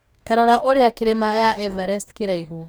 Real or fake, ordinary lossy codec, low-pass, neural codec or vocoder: fake; none; none; codec, 44.1 kHz, 2.6 kbps, DAC